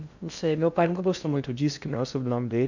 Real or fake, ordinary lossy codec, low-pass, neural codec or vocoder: fake; none; 7.2 kHz; codec, 16 kHz in and 24 kHz out, 0.6 kbps, FocalCodec, streaming, 2048 codes